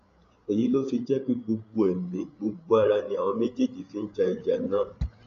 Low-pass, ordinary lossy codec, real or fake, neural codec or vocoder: 7.2 kHz; AAC, 64 kbps; fake; codec, 16 kHz, 8 kbps, FreqCodec, larger model